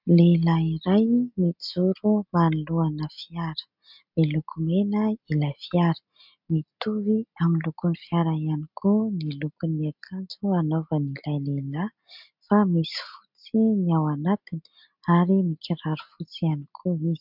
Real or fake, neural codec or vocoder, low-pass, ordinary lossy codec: real; none; 5.4 kHz; MP3, 48 kbps